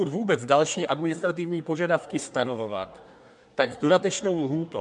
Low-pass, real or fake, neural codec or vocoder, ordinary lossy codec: 10.8 kHz; fake; codec, 24 kHz, 1 kbps, SNAC; MP3, 64 kbps